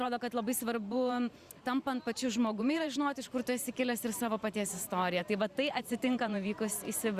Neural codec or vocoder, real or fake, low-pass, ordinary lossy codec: vocoder, 44.1 kHz, 128 mel bands every 512 samples, BigVGAN v2; fake; 14.4 kHz; Opus, 64 kbps